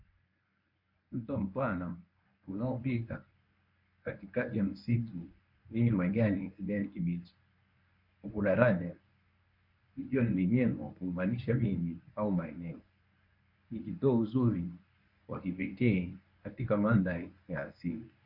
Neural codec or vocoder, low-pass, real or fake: codec, 24 kHz, 0.9 kbps, WavTokenizer, medium speech release version 1; 5.4 kHz; fake